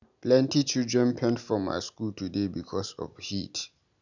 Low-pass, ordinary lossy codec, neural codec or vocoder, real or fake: 7.2 kHz; none; none; real